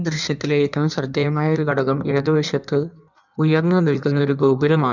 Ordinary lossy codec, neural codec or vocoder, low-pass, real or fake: none; codec, 16 kHz in and 24 kHz out, 1.1 kbps, FireRedTTS-2 codec; 7.2 kHz; fake